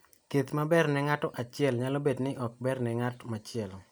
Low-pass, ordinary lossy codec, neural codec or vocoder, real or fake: none; none; none; real